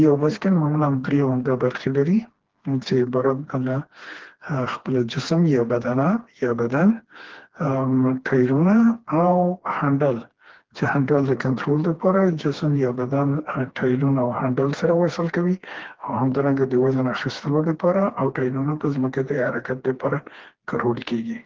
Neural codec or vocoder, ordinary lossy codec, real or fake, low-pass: codec, 16 kHz, 2 kbps, FreqCodec, smaller model; Opus, 16 kbps; fake; 7.2 kHz